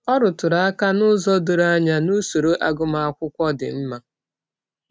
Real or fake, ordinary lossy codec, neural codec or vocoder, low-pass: real; none; none; none